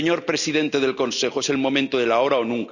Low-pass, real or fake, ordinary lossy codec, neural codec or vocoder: 7.2 kHz; real; none; none